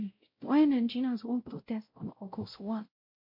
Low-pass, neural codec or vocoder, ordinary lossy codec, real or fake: 5.4 kHz; codec, 16 kHz, 0.5 kbps, X-Codec, WavLM features, trained on Multilingual LibriSpeech; MP3, 32 kbps; fake